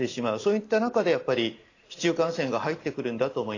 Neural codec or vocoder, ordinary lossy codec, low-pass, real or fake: none; AAC, 32 kbps; 7.2 kHz; real